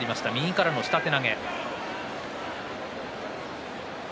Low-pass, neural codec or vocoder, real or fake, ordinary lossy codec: none; none; real; none